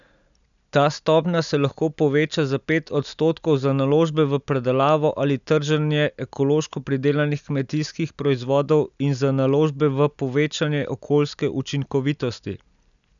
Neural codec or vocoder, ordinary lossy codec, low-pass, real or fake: none; none; 7.2 kHz; real